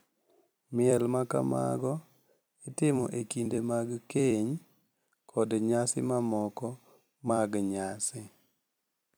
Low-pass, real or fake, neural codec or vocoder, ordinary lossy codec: none; fake; vocoder, 44.1 kHz, 128 mel bands every 256 samples, BigVGAN v2; none